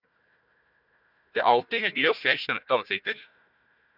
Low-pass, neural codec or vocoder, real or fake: 5.4 kHz; codec, 16 kHz, 1 kbps, FunCodec, trained on Chinese and English, 50 frames a second; fake